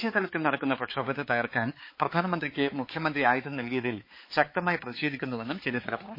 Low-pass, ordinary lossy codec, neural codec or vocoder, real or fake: 5.4 kHz; MP3, 24 kbps; codec, 16 kHz, 4 kbps, X-Codec, HuBERT features, trained on balanced general audio; fake